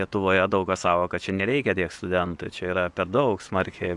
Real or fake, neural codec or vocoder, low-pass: fake; vocoder, 24 kHz, 100 mel bands, Vocos; 10.8 kHz